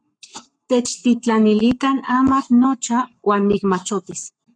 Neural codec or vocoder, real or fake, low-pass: codec, 44.1 kHz, 7.8 kbps, Pupu-Codec; fake; 9.9 kHz